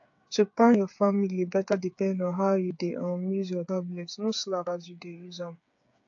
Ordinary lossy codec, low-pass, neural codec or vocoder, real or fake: AAC, 48 kbps; 7.2 kHz; codec, 16 kHz, 8 kbps, FreqCodec, smaller model; fake